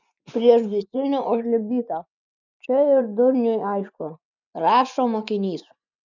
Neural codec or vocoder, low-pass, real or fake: vocoder, 24 kHz, 100 mel bands, Vocos; 7.2 kHz; fake